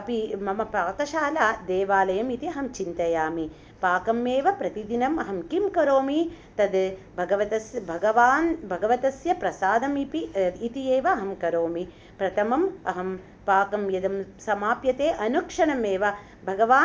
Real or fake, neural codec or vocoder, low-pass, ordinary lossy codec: real; none; none; none